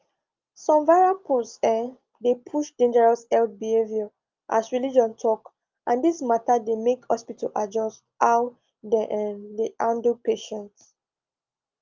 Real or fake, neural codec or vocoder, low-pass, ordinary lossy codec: real; none; 7.2 kHz; Opus, 24 kbps